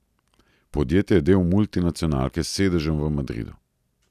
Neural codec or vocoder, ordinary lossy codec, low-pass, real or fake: none; none; 14.4 kHz; real